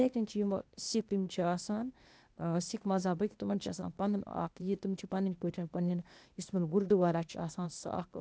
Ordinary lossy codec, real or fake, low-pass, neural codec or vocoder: none; fake; none; codec, 16 kHz, 0.8 kbps, ZipCodec